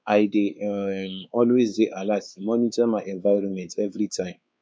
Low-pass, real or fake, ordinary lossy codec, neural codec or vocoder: none; fake; none; codec, 16 kHz, 4 kbps, X-Codec, WavLM features, trained on Multilingual LibriSpeech